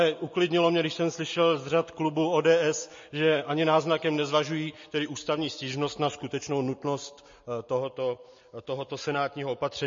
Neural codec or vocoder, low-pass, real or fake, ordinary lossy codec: none; 7.2 kHz; real; MP3, 32 kbps